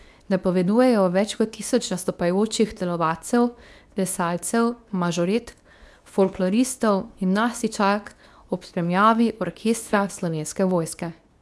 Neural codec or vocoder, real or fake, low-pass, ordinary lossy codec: codec, 24 kHz, 0.9 kbps, WavTokenizer, medium speech release version 2; fake; none; none